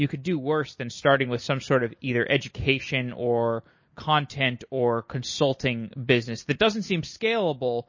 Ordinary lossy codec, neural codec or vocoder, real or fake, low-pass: MP3, 32 kbps; none; real; 7.2 kHz